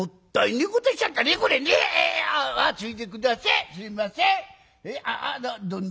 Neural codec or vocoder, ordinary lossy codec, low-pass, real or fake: none; none; none; real